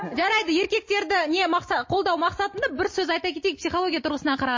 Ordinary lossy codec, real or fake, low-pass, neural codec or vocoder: MP3, 32 kbps; real; 7.2 kHz; none